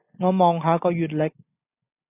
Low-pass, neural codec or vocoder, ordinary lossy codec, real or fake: 3.6 kHz; none; AAC, 24 kbps; real